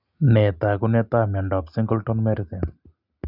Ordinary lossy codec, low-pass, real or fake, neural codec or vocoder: none; 5.4 kHz; real; none